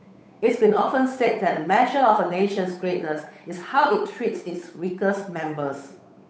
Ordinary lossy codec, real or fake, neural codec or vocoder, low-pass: none; fake; codec, 16 kHz, 8 kbps, FunCodec, trained on Chinese and English, 25 frames a second; none